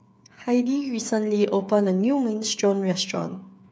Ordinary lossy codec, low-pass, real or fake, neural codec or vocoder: none; none; fake; codec, 16 kHz, 8 kbps, FreqCodec, smaller model